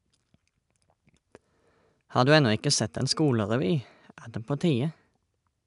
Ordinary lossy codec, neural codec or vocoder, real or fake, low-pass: none; none; real; 10.8 kHz